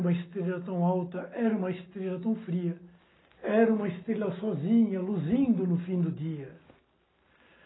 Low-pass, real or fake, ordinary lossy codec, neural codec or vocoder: 7.2 kHz; real; AAC, 16 kbps; none